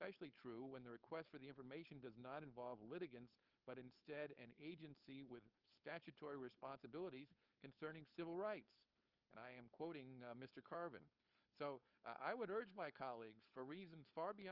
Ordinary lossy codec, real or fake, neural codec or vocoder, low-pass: Opus, 24 kbps; fake; codec, 16 kHz, 4.8 kbps, FACodec; 5.4 kHz